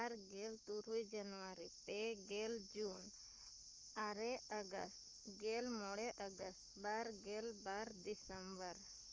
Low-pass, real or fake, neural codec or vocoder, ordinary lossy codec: 7.2 kHz; fake; codec, 44.1 kHz, 7.8 kbps, Pupu-Codec; Opus, 32 kbps